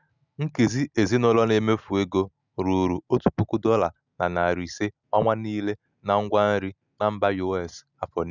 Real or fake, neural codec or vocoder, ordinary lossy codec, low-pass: real; none; none; 7.2 kHz